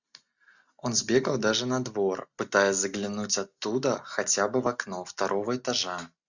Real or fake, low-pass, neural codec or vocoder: real; 7.2 kHz; none